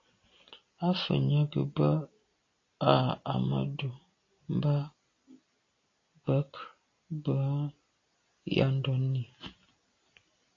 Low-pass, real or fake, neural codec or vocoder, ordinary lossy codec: 7.2 kHz; real; none; AAC, 32 kbps